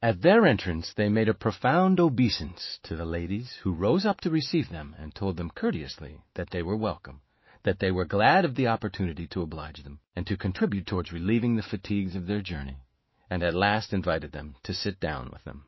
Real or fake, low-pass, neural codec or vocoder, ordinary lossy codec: fake; 7.2 kHz; autoencoder, 48 kHz, 128 numbers a frame, DAC-VAE, trained on Japanese speech; MP3, 24 kbps